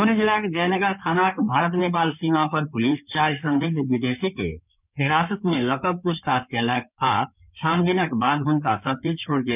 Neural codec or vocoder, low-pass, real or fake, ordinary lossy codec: codec, 16 kHz in and 24 kHz out, 2.2 kbps, FireRedTTS-2 codec; 3.6 kHz; fake; none